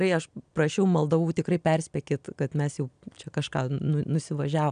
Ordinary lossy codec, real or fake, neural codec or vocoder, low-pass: MP3, 96 kbps; real; none; 9.9 kHz